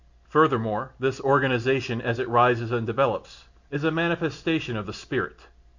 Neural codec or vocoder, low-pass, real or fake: none; 7.2 kHz; real